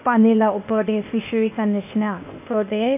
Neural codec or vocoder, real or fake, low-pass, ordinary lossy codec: codec, 16 kHz, 0.8 kbps, ZipCodec; fake; 3.6 kHz; none